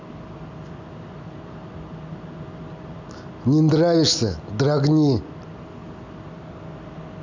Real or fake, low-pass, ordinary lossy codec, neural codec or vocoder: real; 7.2 kHz; none; none